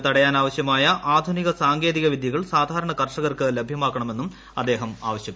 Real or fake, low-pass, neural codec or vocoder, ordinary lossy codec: real; none; none; none